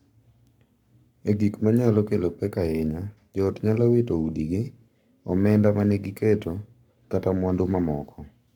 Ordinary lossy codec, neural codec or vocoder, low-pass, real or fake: none; codec, 44.1 kHz, 7.8 kbps, Pupu-Codec; 19.8 kHz; fake